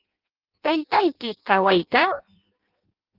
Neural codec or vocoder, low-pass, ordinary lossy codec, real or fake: codec, 16 kHz in and 24 kHz out, 0.6 kbps, FireRedTTS-2 codec; 5.4 kHz; Opus, 24 kbps; fake